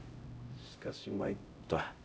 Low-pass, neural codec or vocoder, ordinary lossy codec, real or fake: none; codec, 16 kHz, 0.5 kbps, X-Codec, HuBERT features, trained on LibriSpeech; none; fake